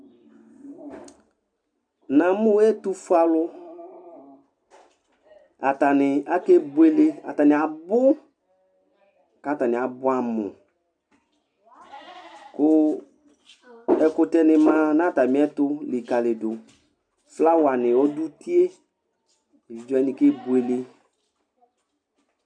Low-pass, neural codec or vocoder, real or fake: 9.9 kHz; none; real